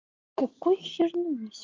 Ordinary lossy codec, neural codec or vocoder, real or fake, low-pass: Opus, 24 kbps; none; real; 7.2 kHz